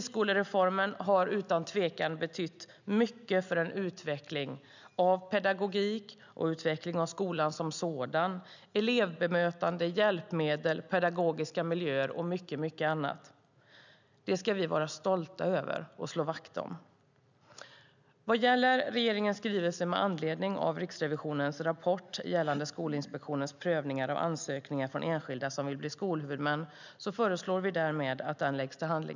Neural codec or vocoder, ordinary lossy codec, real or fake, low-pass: none; none; real; 7.2 kHz